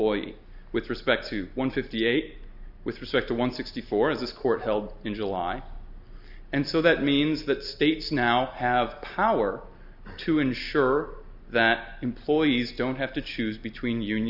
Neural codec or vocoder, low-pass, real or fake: none; 5.4 kHz; real